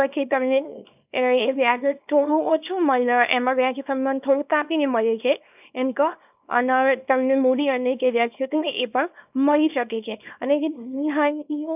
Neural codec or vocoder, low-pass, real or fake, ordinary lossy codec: codec, 24 kHz, 0.9 kbps, WavTokenizer, small release; 3.6 kHz; fake; none